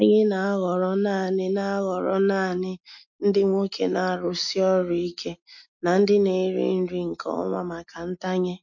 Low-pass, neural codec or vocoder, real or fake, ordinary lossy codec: 7.2 kHz; none; real; MP3, 48 kbps